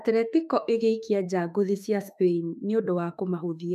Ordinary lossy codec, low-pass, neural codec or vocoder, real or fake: MP3, 96 kbps; 14.4 kHz; autoencoder, 48 kHz, 32 numbers a frame, DAC-VAE, trained on Japanese speech; fake